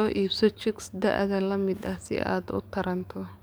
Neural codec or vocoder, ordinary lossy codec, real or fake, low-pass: codec, 44.1 kHz, 7.8 kbps, DAC; none; fake; none